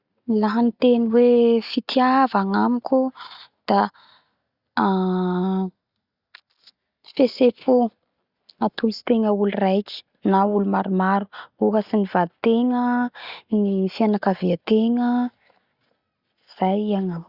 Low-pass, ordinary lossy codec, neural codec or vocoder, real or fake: 5.4 kHz; Opus, 64 kbps; none; real